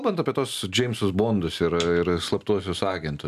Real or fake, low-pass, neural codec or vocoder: real; 14.4 kHz; none